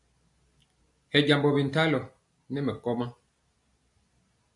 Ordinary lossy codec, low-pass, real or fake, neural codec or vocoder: AAC, 64 kbps; 10.8 kHz; real; none